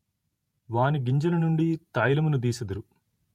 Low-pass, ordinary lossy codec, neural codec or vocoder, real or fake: 19.8 kHz; MP3, 64 kbps; vocoder, 48 kHz, 128 mel bands, Vocos; fake